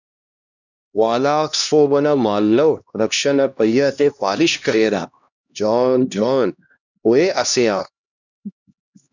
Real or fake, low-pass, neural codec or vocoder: fake; 7.2 kHz; codec, 16 kHz, 1 kbps, X-Codec, HuBERT features, trained on LibriSpeech